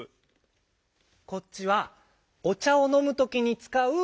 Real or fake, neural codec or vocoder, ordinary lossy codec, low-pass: real; none; none; none